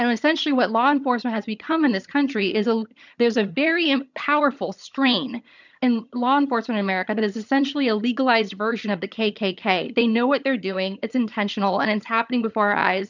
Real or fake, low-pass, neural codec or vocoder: fake; 7.2 kHz; vocoder, 22.05 kHz, 80 mel bands, HiFi-GAN